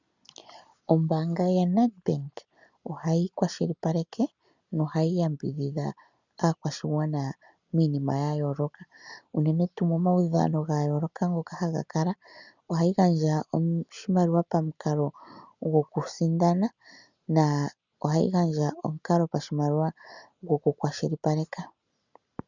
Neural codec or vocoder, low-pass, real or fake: none; 7.2 kHz; real